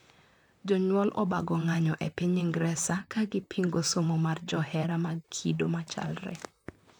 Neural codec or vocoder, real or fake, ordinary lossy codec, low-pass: vocoder, 44.1 kHz, 128 mel bands, Pupu-Vocoder; fake; none; 19.8 kHz